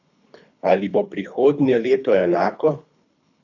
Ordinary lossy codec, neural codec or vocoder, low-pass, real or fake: none; codec, 24 kHz, 3 kbps, HILCodec; 7.2 kHz; fake